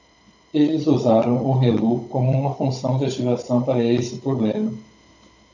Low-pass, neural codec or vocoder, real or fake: 7.2 kHz; codec, 16 kHz, 16 kbps, FunCodec, trained on Chinese and English, 50 frames a second; fake